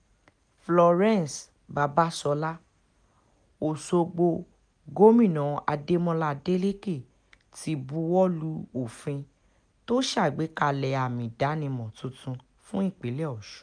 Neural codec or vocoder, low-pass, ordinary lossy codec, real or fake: none; 9.9 kHz; none; real